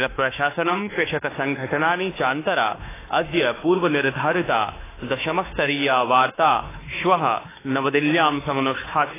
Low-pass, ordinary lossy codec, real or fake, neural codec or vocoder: 3.6 kHz; AAC, 16 kbps; fake; autoencoder, 48 kHz, 32 numbers a frame, DAC-VAE, trained on Japanese speech